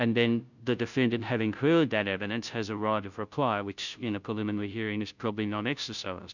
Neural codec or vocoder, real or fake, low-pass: codec, 16 kHz, 0.5 kbps, FunCodec, trained on Chinese and English, 25 frames a second; fake; 7.2 kHz